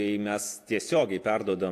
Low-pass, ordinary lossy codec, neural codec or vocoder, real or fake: 14.4 kHz; AAC, 64 kbps; vocoder, 48 kHz, 128 mel bands, Vocos; fake